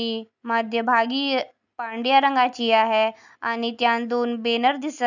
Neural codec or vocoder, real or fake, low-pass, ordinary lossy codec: none; real; 7.2 kHz; none